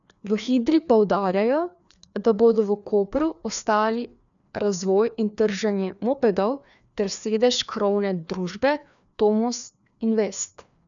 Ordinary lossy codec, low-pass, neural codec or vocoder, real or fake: none; 7.2 kHz; codec, 16 kHz, 2 kbps, FreqCodec, larger model; fake